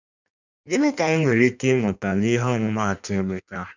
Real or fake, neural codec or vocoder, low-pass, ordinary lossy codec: fake; codec, 16 kHz in and 24 kHz out, 1.1 kbps, FireRedTTS-2 codec; 7.2 kHz; none